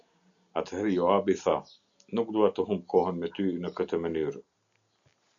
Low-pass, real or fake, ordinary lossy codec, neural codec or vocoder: 7.2 kHz; real; MP3, 96 kbps; none